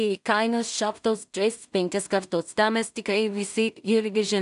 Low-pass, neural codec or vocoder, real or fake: 10.8 kHz; codec, 16 kHz in and 24 kHz out, 0.4 kbps, LongCat-Audio-Codec, two codebook decoder; fake